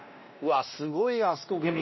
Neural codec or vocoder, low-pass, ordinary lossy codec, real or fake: codec, 16 kHz, 1 kbps, X-Codec, WavLM features, trained on Multilingual LibriSpeech; 7.2 kHz; MP3, 24 kbps; fake